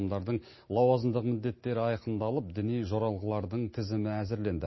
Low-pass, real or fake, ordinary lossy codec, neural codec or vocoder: 7.2 kHz; real; MP3, 24 kbps; none